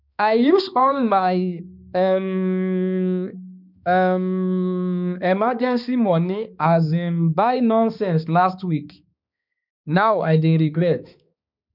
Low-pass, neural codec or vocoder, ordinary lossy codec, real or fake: 5.4 kHz; codec, 16 kHz, 2 kbps, X-Codec, HuBERT features, trained on balanced general audio; none; fake